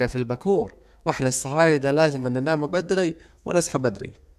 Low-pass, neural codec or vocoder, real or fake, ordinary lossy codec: 14.4 kHz; codec, 32 kHz, 1.9 kbps, SNAC; fake; none